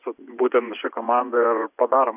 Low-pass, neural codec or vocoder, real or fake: 3.6 kHz; vocoder, 24 kHz, 100 mel bands, Vocos; fake